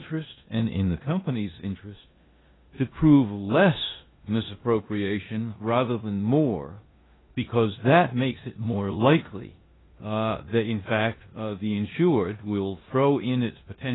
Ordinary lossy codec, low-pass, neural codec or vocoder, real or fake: AAC, 16 kbps; 7.2 kHz; codec, 16 kHz in and 24 kHz out, 0.9 kbps, LongCat-Audio-Codec, four codebook decoder; fake